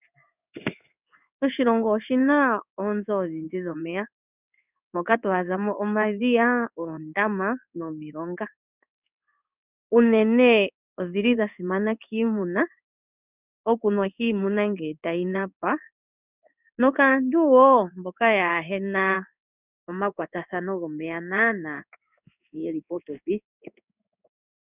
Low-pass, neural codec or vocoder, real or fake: 3.6 kHz; codec, 16 kHz in and 24 kHz out, 1 kbps, XY-Tokenizer; fake